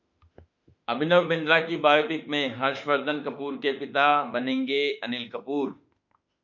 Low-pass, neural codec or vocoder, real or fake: 7.2 kHz; autoencoder, 48 kHz, 32 numbers a frame, DAC-VAE, trained on Japanese speech; fake